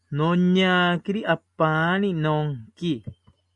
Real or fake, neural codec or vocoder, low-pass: real; none; 10.8 kHz